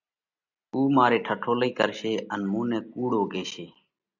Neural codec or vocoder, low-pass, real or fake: none; 7.2 kHz; real